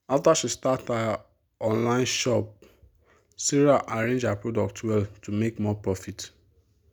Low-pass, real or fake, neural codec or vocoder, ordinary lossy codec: none; real; none; none